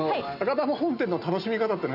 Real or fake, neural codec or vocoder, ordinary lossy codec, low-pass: real; none; none; 5.4 kHz